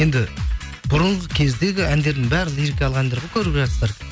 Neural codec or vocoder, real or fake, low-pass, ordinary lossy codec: none; real; none; none